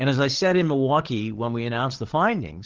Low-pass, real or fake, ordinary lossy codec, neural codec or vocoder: 7.2 kHz; fake; Opus, 16 kbps; codec, 16 kHz, 8 kbps, FreqCodec, larger model